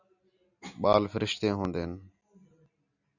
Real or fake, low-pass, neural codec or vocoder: real; 7.2 kHz; none